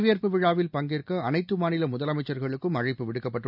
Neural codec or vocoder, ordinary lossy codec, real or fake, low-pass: none; none; real; 5.4 kHz